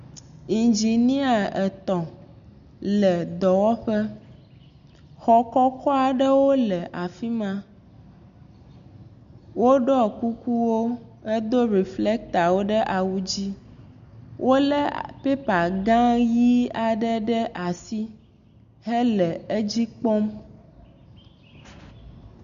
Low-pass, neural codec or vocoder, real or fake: 7.2 kHz; none; real